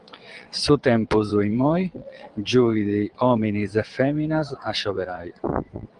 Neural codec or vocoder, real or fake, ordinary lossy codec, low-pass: vocoder, 22.05 kHz, 80 mel bands, WaveNeXt; fake; Opus, 24 kbps; 9.9 kHz